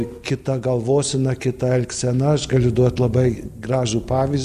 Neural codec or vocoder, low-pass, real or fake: none; 14.4 kHz; real